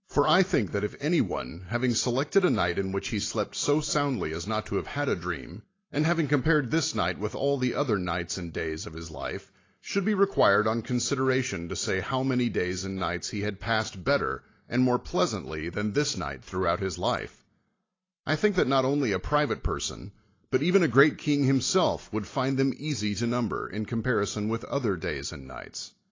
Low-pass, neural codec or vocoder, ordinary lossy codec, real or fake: 7.2 kHz; none; AAC, 32 kbps; real